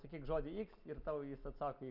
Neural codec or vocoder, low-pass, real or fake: none; 5.4 kHz; real